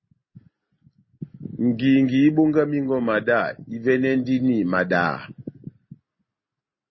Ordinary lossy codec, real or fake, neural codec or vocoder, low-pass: MP3, 24 kbps; real; none; 7.2 kHz